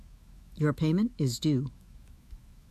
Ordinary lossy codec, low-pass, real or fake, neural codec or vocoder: MP3, 96 kbps; 14.4 kHz; fake; autoencoder, 48 kHz, 128 numbers a frame, DAC-VAE, trained on Japanese speech